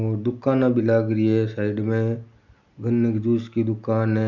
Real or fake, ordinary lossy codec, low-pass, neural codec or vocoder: real; none; 7.2 kHz; none